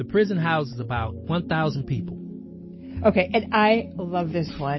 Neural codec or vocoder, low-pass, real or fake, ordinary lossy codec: none; 7.2 kHz; real; MP3, 24 kbps